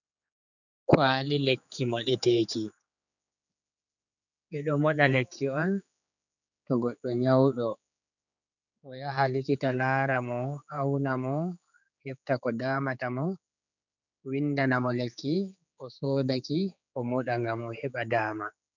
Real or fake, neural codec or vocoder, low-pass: fake; codec, 16 kHz, 4 kbps, X-Codec, HuBERT features, trained on general audio; 7.2 kHz